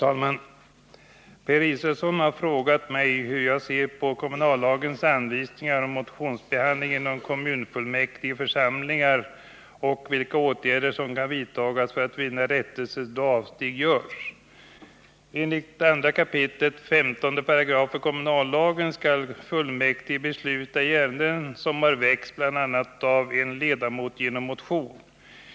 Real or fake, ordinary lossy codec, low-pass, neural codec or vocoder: real; none; none; none